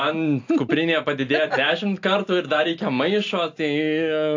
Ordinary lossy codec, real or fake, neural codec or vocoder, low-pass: AAC, 48 kbps; real; none; 7.2 kHz